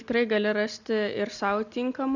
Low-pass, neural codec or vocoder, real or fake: 7.2 kHz; none; real